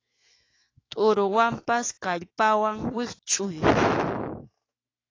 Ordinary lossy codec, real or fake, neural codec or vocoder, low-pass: AAC, 32 kbps; fake; autoencoder, 48 kHz, 128 numbers a frame, DAC-VAE, trained on Japanese speech; 7.2 kHz